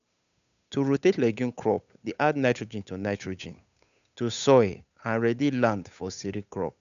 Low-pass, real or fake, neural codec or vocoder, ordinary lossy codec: 7.2 kHz; fake; codec, 16 kHz, 8 kbps, FunCodec, trained on Chinese and English, 25 frames a second; none